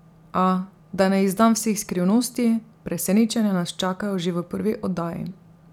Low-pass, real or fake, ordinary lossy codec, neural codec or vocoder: 19.8 kHz; fake; none; vocoder, 44.1 kHz, 128 mel bands every 256 samples, BigVGAN v2